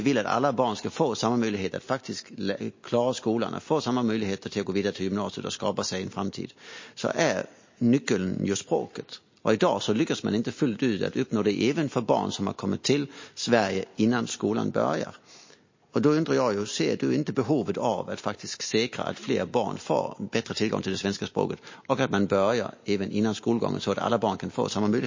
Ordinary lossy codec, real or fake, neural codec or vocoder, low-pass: MP3, 32 kbps; real; none; 7.2 kHz